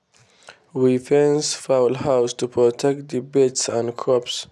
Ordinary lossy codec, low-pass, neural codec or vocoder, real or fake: none; none; none; real